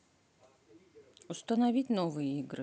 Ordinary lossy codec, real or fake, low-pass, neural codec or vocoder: none; real; none; none